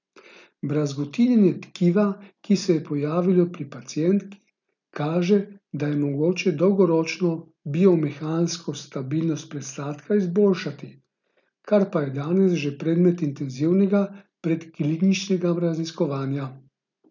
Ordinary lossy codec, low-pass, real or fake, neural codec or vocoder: none; 7.2 kHz; real; none